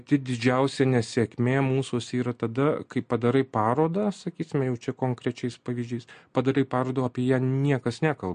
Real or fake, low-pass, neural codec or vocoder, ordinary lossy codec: real; 9.9 kHz; none; MP3, 48 kbps